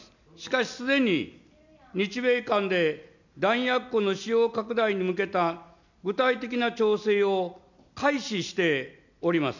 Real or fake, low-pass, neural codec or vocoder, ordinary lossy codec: real; 7.2 kHz; none; none